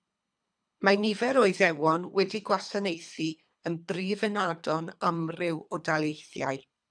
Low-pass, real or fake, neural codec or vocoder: 9.9 kHz; fake; codec, 24 kHz, 3 kbps, HILCodec